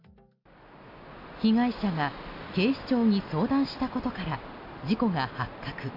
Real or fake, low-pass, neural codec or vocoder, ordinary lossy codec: real; 5.4 kHz; none; AAC, 32 kbps